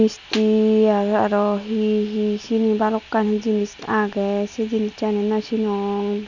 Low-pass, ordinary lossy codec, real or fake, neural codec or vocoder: 7.2 kHz; none; real; none